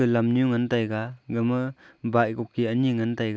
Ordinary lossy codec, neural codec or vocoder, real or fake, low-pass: none; none; real; none